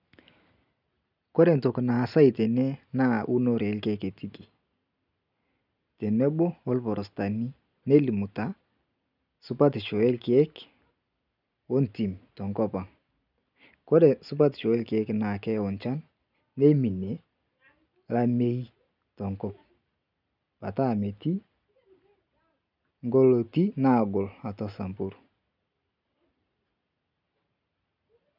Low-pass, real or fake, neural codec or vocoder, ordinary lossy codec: 5.4 kHz; real; none; none